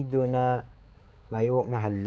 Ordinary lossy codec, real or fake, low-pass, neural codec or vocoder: none; fake; none; codec, 16 kHz, 4 kbps, X-Codec, HuBERT features, trained on balanced general audio